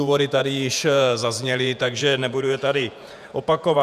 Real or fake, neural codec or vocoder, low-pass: fake; vocoder, 48 kHz, 128 mel bands, Vocos; 14.4 kHz